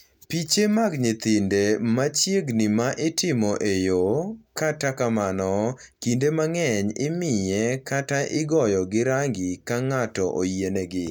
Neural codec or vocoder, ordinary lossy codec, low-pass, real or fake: none; none; 19.8 kHz; real